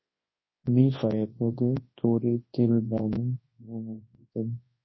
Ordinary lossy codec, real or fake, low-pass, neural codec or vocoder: MP3, 24 kbps; fake; 7.2 kHz; codec, 24 kHz, 0.9 kbps, WavTokenizer, large speech release